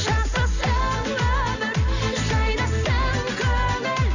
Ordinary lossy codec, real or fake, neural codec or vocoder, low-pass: none; real; none; 7.2 kHz